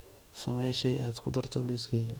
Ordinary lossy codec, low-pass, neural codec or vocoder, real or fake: none; none; codec, 44.1 kHz, 2.6 kbps, DAC; fake